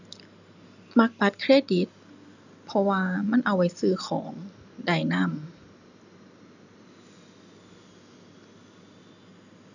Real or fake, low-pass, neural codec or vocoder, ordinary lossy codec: real; 7.2 kHz; none; none